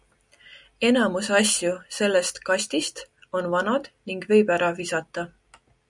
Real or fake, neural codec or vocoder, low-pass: real; none; 10.8 kHz